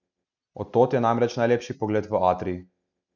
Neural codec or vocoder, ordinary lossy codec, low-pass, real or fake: none; none; 7.2 kHz; real